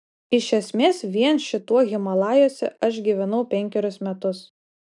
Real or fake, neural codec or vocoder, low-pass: real; none; 10.8 kHz